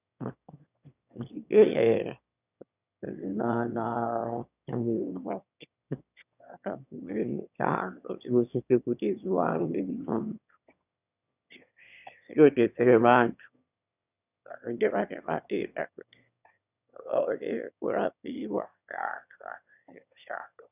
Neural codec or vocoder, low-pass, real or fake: autoencoder, 22.05 kHz, a latent of 192 numbers a frame, VITS, trained on one speaker; 3.6 kHz; fake